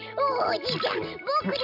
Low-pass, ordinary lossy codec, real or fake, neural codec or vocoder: 5.4 kHz; AAC, 48 kbps; real; none